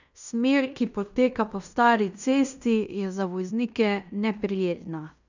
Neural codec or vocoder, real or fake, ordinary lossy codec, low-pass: codec, 16 kHz in and 24 kHz out, 0.9 kbps, LongCat-Audio-Codec, fine tuned four codebook decoder; fake; none; 7.2 kHz